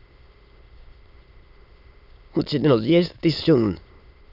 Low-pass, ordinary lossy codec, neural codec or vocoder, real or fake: 5.4 kHz; none; autoencoder, 22.05 kHz, a latent of 192 numbers a frame, VITS, trained on many speakers; fake